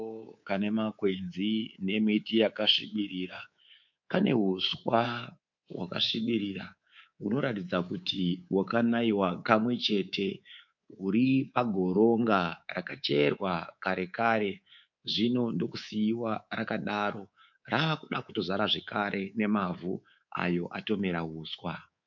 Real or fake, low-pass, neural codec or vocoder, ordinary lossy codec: fake; 7.2 kHz; codec, 24 kHz, 3.1 kbps, DualCodec; MP3, 64 kbps